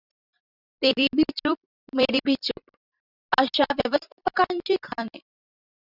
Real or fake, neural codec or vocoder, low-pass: fake; vocoder, 44.1 kHz, 128 mel bands every 256 samples, BigVGAN v2; 5.4 kHz